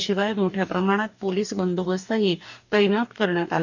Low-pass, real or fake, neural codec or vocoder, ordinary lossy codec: 7.2 kHz; fake; codec, 44.1 kHz, 2.6 kbps, DAC; none